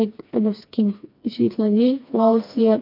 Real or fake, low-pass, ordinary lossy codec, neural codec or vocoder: fake; 5.4 kHz; MP3, 48 kbps; codec, 16 kHz, 2 kbps, FreqCodec, smaller model